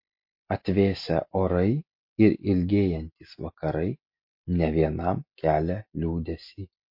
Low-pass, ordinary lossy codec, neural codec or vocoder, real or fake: 5.4 kHz; MP3, 32 kbps; none; real